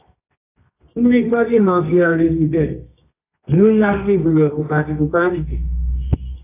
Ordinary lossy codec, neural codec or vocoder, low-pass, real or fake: AAC, 24 kbps; codec, 24 kHz, 0.9 kbps, WavTokenizer, medium music audio release; 3.6 kHz; fake